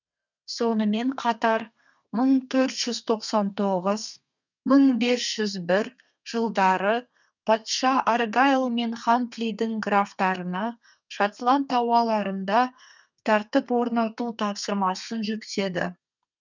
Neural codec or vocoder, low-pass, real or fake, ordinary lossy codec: codec, 32 kHz, 1.9 kbps, SNAC; 7.2 kHz; fake; none